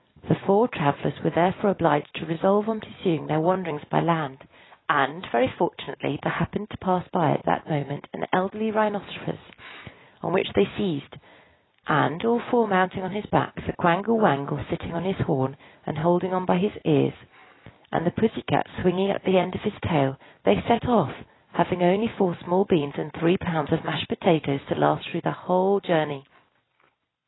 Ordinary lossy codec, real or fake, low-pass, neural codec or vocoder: AAC, 16 kbps; real; 7.2 kHz; none